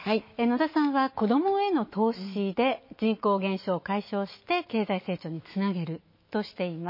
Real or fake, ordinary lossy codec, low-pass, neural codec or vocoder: fake; MP3, 24 kbps; 5.4 kHz; autoencoder, 48 kHz, 128 numbers a frame, DAC-VAE, trained on Japanese speech